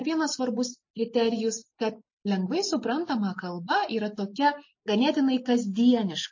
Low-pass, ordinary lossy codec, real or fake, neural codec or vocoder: 7.2 kHz; MP3, 32 kbps; real; none